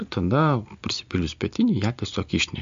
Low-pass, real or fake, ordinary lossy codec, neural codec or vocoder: 7.2 kHz; real; MP3, 48 kbps; none